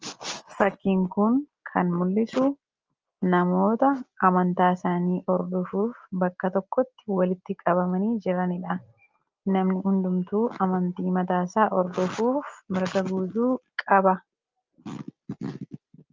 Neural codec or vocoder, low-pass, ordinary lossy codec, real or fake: none; 7.2 kHz; Opus, 24 kbps; real